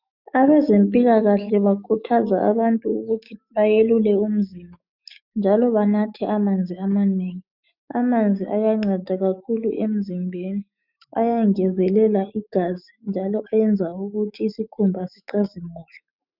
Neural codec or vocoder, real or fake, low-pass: autoencoder, 48 kHz, 128 numbers a frame, DAC-VAE, trained on Japanese speech; fake; 5.4 kHz